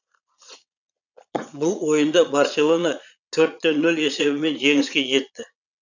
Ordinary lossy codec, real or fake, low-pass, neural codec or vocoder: none; fake; 7.2 kHz; vocoder, 22.05 kHz, 80 mel bands, Vocos